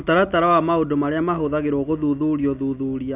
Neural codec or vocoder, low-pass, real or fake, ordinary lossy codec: none; 3.6 kHz; real; none